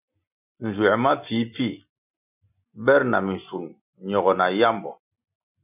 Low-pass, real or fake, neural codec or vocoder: 3.6 kHz; real; none